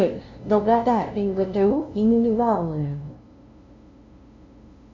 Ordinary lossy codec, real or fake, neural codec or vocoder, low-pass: Opus, 64 kbps; fake; codec, 16 kHz, 0.5 kbps, FunCodec, trained on LibriTTS, 25 frames a second; 7.2 kHz